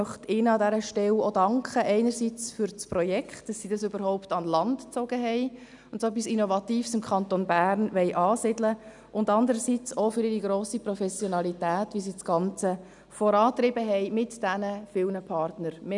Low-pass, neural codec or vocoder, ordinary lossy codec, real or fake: 10.8 kHz; none; none; real